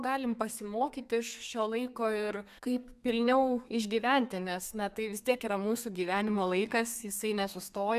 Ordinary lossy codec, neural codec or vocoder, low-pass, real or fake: AAC, 96 kbps; codec, 32 kHz, 1.9 kbps, SNAC; 14.4 kHz; fake